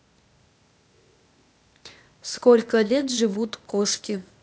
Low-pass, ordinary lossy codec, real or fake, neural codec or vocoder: none; none; fake; codec, 16 kHz, 0.8 kbps, ZipCodec